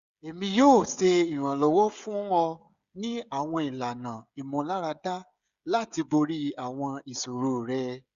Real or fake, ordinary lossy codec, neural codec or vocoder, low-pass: fake; Opus, 64 kbps; codec, 16 kHz, 16 kbps, FreqCodec, smaller model; 7.2 kHz